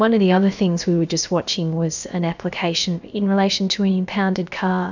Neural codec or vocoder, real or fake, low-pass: codec, 16 kHz, about 1 kbps, DyCAST, with the encoder's durations; fake; 7.2 kHz